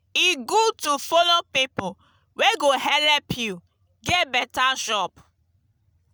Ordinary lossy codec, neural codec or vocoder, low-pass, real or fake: none; none; none; real